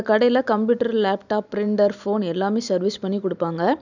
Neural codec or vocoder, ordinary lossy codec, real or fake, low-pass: none; none; real; 7.2 kHz